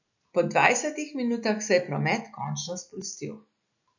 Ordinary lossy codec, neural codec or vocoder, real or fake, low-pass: none; none; real; 7.2 kHz